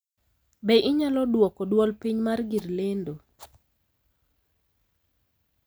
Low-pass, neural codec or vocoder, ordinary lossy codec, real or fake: none; none; none; real